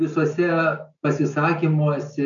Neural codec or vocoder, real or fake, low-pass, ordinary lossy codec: none; real; 7.2 kHz; MP3, 96 kbps